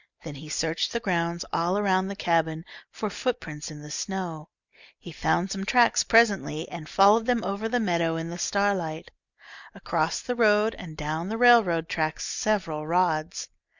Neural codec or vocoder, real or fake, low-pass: none; real; 7.2 kHz